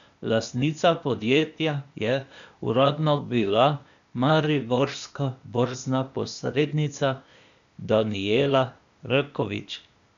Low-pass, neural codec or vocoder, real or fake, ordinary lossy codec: 7.2 kHz; codec, 16 kHz, 0.8 kbps, ZipCodec; fake; none